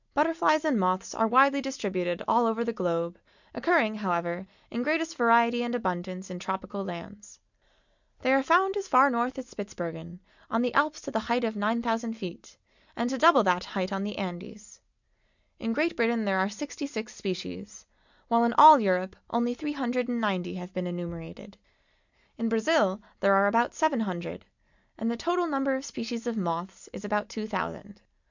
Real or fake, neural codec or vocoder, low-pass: real; none; 7.2 kHz